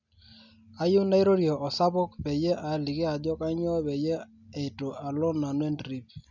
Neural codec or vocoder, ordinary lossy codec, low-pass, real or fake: none; none; 7.2 kHz; real